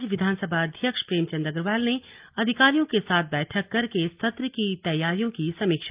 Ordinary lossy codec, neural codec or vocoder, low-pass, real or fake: Opus, 32 kbps; none; 3.6 kHz; real